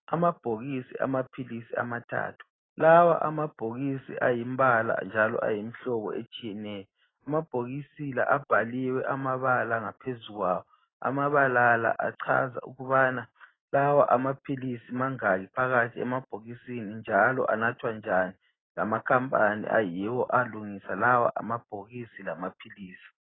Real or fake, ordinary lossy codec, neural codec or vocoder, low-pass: real; AAC, 16 kbps; none; 7.2 kHz